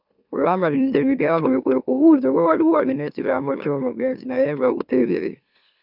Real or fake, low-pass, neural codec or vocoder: fake; 5.4 kHz; autoencoder, 44.1 kHz, a latent of 192 numbers a frame, MeloTTS